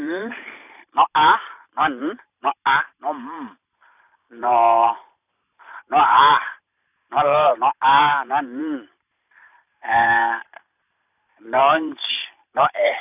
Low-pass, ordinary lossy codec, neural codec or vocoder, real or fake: 3.6 kHz; none; vocoder, 44.1 kHz, 128 mel bands every 512 samples, BigVGAN v2; fake